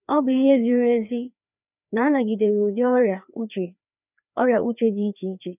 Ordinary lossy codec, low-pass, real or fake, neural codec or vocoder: none; 3.6 kHz; fake; codec, 16 kHz, 2 kbps, FreqCodec, larger model